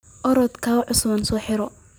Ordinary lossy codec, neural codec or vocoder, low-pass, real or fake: none; none; none; real